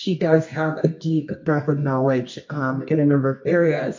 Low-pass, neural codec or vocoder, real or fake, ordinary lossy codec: 7.2 kHz; codec, 24 kHz, 0.9 kbps, WavTokenizer, medium music audio release; fake; MP3, 48 kbps